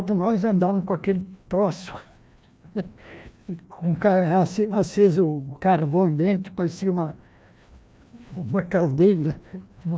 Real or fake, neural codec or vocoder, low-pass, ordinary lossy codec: fake; codec, 16 kHz, 1 kbps, FreqCodec, larger model; none; none